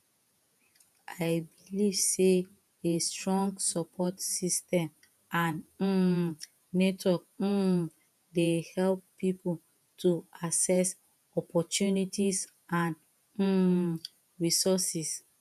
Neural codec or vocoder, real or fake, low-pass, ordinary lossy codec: vocoder, 48 kHz, 128 mel bands, Vocos; fake; 14.4 kHz; none